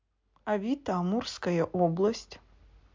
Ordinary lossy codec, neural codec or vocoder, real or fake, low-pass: MP3, 64 kbps; none; real; 7.2 kHz